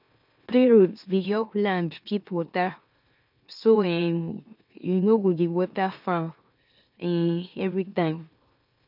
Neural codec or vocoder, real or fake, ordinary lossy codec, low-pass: autoencoder, 44.1 kHz, a latent of 192 numbers a frame, MeloTTS; fake; none; 5.4 kHz